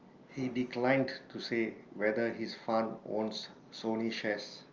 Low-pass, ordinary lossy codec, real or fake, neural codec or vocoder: 7.2 kHz; Opus, 24 kbps; real; none